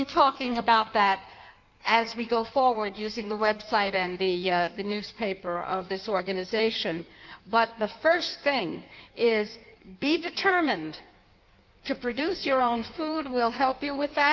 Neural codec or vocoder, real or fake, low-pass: codec, 16 kHz in and 24 kHz out, 1.1 kbps, FireRedTTS-2 codec; fake; 7.2 kHz